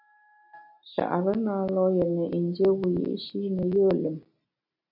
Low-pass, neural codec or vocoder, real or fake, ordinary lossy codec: 5.4 kHz; none; real; MP3, 48 kbps